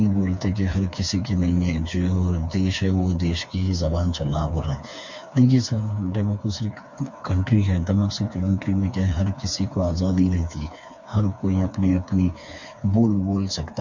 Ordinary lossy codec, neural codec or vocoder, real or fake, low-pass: MP3, 48 kbps; codec, 16 kHz, 4 kbps, FreqCodec, smaller model; fake; 7.2 kHz